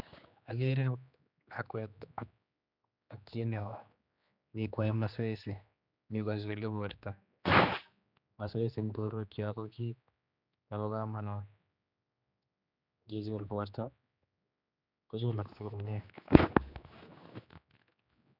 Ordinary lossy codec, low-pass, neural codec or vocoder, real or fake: none; 5.4 kHz; codec, 16 kHz, 2 kbps, X-Codec, HuBERT features, trained on general audio; fake